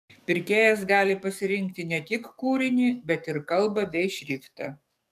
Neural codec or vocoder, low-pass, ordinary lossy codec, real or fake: codec, 44.1 kHz, 7.8 kbps, DAC; 14.4 kHz; MP3, 96 kbps; fake